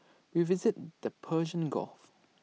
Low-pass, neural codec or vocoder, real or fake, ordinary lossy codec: none; none; real; none